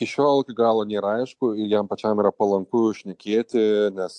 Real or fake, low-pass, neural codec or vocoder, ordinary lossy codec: real; 10.8 kHz; none; AAC, 64 kbps